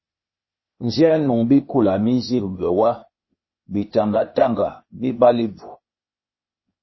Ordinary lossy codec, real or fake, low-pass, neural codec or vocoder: MP3, 24 kbps; fake; 7.2 kHz; codec, 16 kHz, 0.8 kbps, ZipCodec